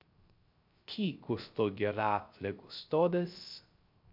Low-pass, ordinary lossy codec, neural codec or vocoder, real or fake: 5.4 kHz; MP3, 48 kbps; codec, 16 kHz, 0.3 kbps, FocalCodec; fake